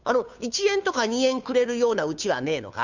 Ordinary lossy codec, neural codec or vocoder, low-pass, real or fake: none; codec, 16 kHz, 2 kbps, FunCodec, trained on Chinese and English, 25 frames a second; 7.2 kHz; fake